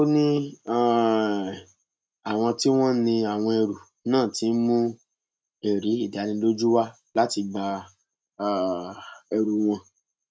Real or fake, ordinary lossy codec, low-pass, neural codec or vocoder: real; none; none; none